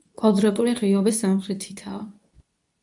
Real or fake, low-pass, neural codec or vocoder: fake; 10.8 kHz; codec, 24 kHz, 0.9 kbps, WavTokenizer, medium speech release version 2